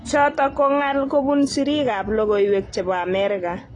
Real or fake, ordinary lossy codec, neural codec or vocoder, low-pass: real; AAC, 32 kbps; none; 10.8 kHz